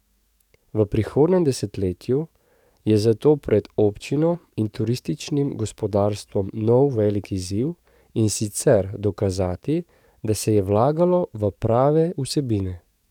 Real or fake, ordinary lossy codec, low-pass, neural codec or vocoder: fake; none; 19.8 kHz; codec, 44.1 kHz, 7.8 kbps, DAC